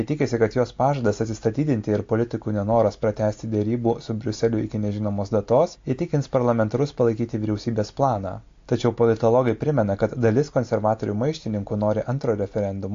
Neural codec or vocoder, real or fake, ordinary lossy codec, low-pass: none; real; AAC, 48 kbps; 7.2 kHz